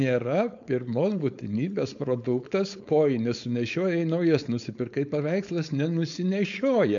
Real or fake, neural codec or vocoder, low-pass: fake; codec, 16 kHz, 4.8 kbps, FACodec; 7.2 kHz